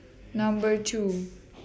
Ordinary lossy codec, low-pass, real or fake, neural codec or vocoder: none; none; real; none